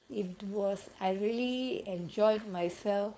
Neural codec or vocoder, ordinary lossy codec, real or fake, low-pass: codec, 16 kHz, 4.8 kbps, FACodec; none; fake; none